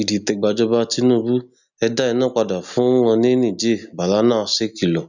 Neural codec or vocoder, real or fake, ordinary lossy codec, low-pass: none; real; none; 7.2 kHz